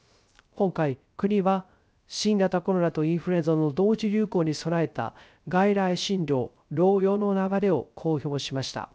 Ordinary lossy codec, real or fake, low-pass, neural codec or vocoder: none; fake; none; codec, 16 kHz, 0.3 kbps, FocalCodec